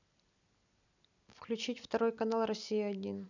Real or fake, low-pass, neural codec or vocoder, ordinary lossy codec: real; 7.2 kHz; none; none